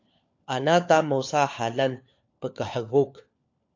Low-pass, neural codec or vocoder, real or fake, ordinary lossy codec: 7.2 kHz; codec, 16 kHz, 4 kbps, FunCodec, trained on LibriTTS, 50 frames a second; fake; AAC, 48 kbps